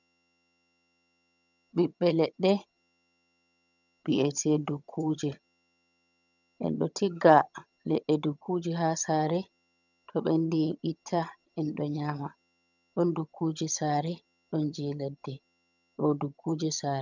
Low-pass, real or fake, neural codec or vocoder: 7.2 kHz; fake; vocoder, 22.05 kHz, 80 mel bands, HiFi-GAN